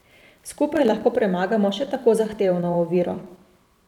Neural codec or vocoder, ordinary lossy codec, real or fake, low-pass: vocoder, 44.1 kHz, 128 mel bands every 512 samples, BigVGAN v2; none; fake; 19.8 kHz